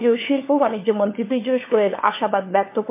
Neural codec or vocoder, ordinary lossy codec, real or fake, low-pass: codec, 16 kHz, 4 kbps, FunCodec, trained on LibriTTS, 50 frames a second; MP3, 24 kbps; fake; 3.6 kHz